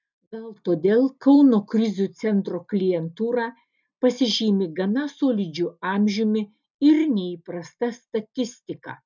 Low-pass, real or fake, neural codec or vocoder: 7.2 kHz; real; none